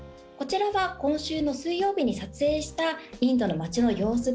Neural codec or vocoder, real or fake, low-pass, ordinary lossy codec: none; real; none; none